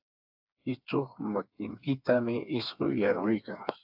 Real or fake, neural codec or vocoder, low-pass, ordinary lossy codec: fake; codec, 16 kHz, 4 kbps, FreqCodec, smaller model; 5.4 kHz; AAC, 48 kbps